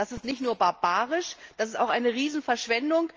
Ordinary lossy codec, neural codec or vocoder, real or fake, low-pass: Opus, 24 kbps; none; real; 7.2 kHz